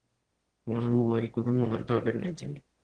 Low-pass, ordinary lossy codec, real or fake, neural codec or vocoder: 9.9 kHz; Opus, 16 kbps; fake; autoencoder, 22.05 kHz, a latent of 192 numbers a frame, VITS, trained on one speaker